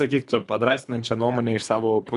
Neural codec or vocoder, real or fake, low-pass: codec, 24 kHz, 3 kbps, HILCodec; fake; 10.8 kHz